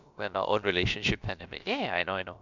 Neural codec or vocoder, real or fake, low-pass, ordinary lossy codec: codec, 16 kHz, about 1 kbps, DyCAST, with the encoder's durations; fake; 7.2 kHz; none